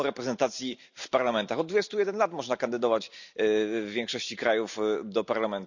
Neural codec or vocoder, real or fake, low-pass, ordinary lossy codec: none; real; 7.2 kHz; none